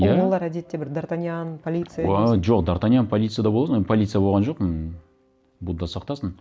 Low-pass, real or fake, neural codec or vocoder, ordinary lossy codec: none; real; none; none